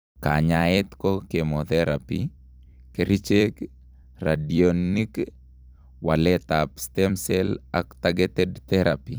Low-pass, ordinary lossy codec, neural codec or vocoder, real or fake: none; none; vocoder, 44.1 kHz, 128 mel bands every 256 samples, BigVGAN v2; fake